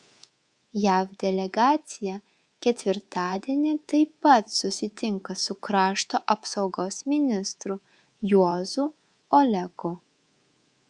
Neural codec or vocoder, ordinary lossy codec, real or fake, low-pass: codec, 24 kHz, 3.1 kbps, DualCodec; Opus, 64 kbps; fake; 10.8 kHz